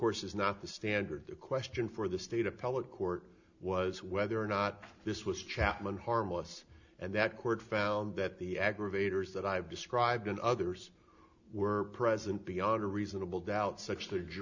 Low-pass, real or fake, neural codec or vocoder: 7.2 kHz; real; none